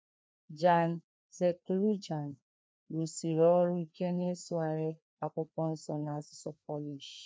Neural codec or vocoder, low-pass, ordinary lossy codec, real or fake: codec, 16 kHz, 2 kbps, FreqCodec, larger model; none; none; fake